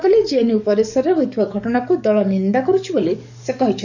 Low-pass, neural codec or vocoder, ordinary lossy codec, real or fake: 7.2 kHz; codec, 44.1 kHz, 7.8 kbps, DAC; none; fake